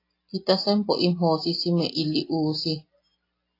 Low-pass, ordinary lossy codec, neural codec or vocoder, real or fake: 5.4 kHz; AAC, 32 kbps; none; real